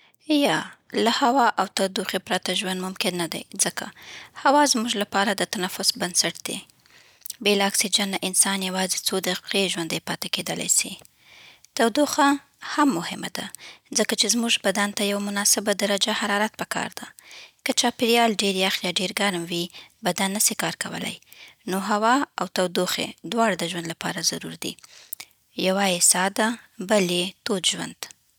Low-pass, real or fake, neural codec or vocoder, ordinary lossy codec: none; real; none; none